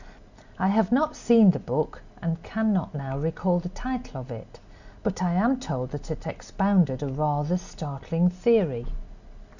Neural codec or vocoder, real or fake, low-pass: none; real; 7.2 kHz